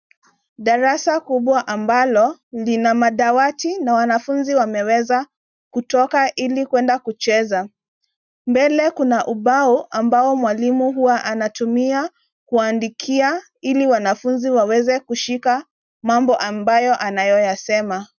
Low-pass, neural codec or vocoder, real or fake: 7.2 kHz; none; real